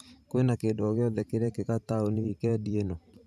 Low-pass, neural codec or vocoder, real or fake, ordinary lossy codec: 14.4 kHz; vocoder, 44.1 kHz, 128 mel bands every 512 samples, BigVGAN v2; fake; none